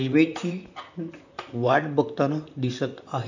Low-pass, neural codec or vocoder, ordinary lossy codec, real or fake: 7.2 kHz; vocoder, 44.1 kHz, 128 mel bands, Pupu-Vocoder; none; fake